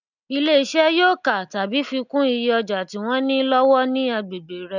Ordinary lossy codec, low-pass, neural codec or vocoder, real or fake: none; 7.2 kHz; none; real